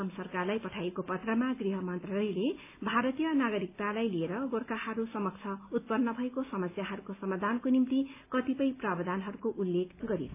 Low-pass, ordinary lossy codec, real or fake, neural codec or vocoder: 3.6 kHz; AAC, 24 kbps; real; none